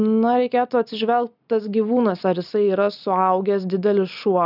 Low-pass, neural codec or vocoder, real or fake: 5.4 kHz; none; real